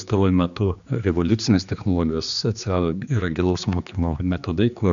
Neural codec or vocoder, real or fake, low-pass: codec, 16 kHz, 2 kbps, X-Codec, HuBERT features, trained on general audio; fake; 7.2 kHz